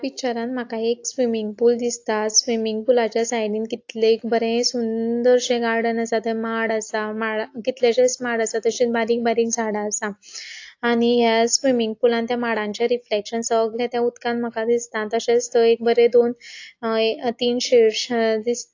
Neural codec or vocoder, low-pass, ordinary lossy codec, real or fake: none; 7.2 kHz; AAC, 48 kbps; real